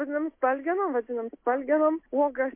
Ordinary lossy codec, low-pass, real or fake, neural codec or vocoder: MP3, 24 kbps; 3.6 kHz; real; none